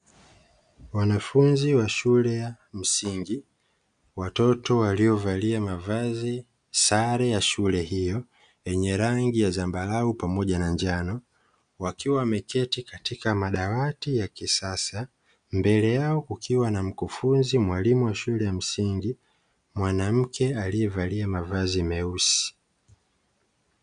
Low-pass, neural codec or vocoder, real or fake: 9.9 kHz; none; real